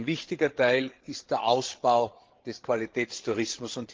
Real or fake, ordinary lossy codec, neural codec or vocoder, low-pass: fake; Opus, 16 kbps; codec, 16 kHz, 8 kbps, FreqCodec, larger model; 7.2 kHz